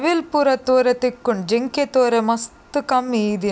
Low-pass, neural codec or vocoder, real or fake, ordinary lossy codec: none; none; real; none